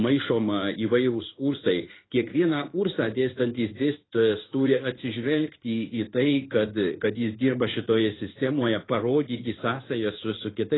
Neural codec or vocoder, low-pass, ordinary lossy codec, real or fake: codec, 16 kHz in and 24 kHz out, 1 kbps, XY-Tokenizer; 7.2 kHz; AAC, 16 kbps; fake